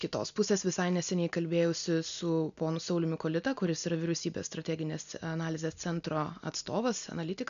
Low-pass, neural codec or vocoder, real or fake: 7.2 kHz; none; real